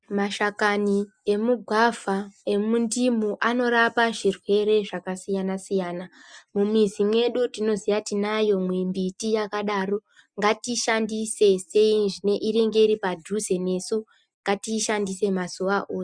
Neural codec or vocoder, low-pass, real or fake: none; 9.9 kHz; real